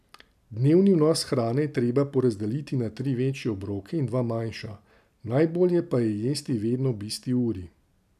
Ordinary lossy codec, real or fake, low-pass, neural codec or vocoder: none; real; 14.4 kHz; none